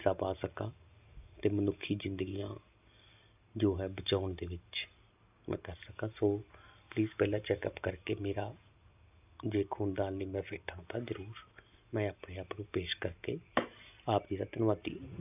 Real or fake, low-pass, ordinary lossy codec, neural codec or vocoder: real; 3.6 kHz; none; none